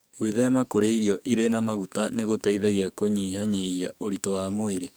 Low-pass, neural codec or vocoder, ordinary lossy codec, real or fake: none; codec, 44.1 kHz, 2.6 kbps, SNAC; none; fake